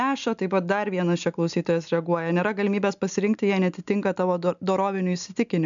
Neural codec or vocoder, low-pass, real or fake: none; 7.2 kHz; real